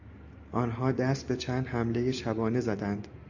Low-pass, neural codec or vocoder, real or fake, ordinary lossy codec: 7.2 kHz; none; real; AAC, 48 kbps